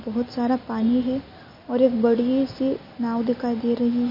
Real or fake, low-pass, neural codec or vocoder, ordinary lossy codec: real; 5.4 kHz; none; MP3, 24 kbps